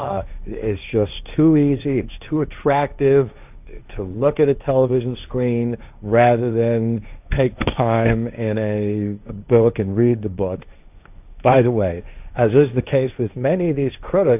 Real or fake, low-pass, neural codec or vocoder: fake; 3.6 kHz; codec, 16 kHz, 1.1 kbps, Voila-Tokenizer